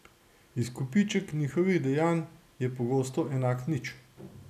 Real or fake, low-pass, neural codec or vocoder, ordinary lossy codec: real; 14.4 kHz; none; none